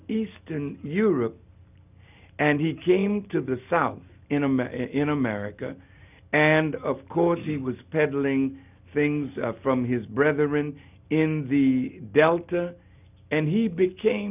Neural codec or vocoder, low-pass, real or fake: none; 3.6 kHz; real